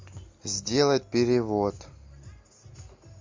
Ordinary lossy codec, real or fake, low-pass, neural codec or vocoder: MP3, 48 kbps; real; 7.2 kHz; none